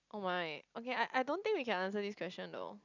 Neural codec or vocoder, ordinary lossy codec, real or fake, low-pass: none; none; real; 7.2 kHz